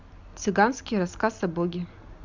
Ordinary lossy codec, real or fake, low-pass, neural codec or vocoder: MP3, 64 kbps; real; 7.2 kHz; none